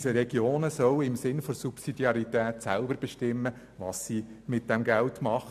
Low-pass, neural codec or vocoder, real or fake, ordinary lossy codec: 14.4 kHz; none; real; MP3, 96 kbps